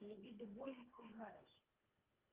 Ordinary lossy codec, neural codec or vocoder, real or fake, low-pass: Opus, 32 kbps; codec, 24 kHz, 1.5 kbps, HILCodec; fake; 3.6 kHz